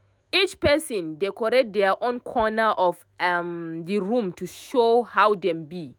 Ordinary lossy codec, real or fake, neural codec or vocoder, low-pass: none; fake; autoencoder, 48 kHz, 128 numbers a frame, DAC-VAE, trained on Japanese speech; 19.8 kHz